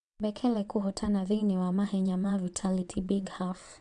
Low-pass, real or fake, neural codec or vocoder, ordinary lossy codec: 9.9 kHz; fake; vocoder, 22.05 kHz, 80 mel bands, WaveNeXt; none